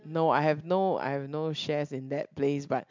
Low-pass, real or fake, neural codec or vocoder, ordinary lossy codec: 7.2 kHz; real; none; MP3, 64 kbps